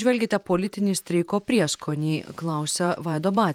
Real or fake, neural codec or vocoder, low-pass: fake; vocoder, 48 kHz, 128 mel bands, Vocos; 19.8 kHz